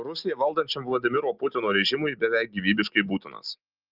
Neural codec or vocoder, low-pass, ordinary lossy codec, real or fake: none; 5.4 kHz; Opus, 32 kbps; real